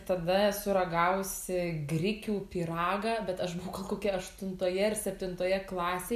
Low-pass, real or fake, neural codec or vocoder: 14.4 kHz; real; none